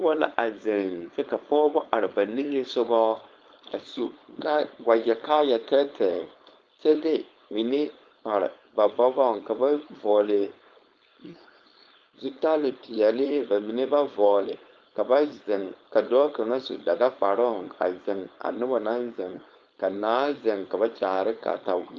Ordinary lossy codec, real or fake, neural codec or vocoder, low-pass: Opus, 32 kbps; fake; codec, 16 kHz, 4.8 kbps, FACodec; 7.2 kHz